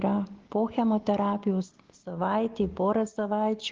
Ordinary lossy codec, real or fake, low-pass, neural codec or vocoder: Opus, 16 kbps; real; 7.2 kHz; none